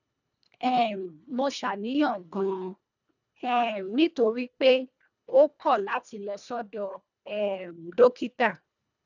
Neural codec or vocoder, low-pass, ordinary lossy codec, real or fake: codec, 24 kHz, 1.5 kbps, HILCodec; 7.2 kHz; none; fake